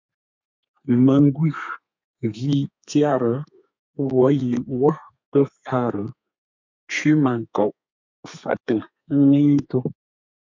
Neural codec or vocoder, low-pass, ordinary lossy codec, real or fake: codec, 32 kHz, 1.9 kbps, SNAC; 7.2 kHz; MP3, 64 kbps; fake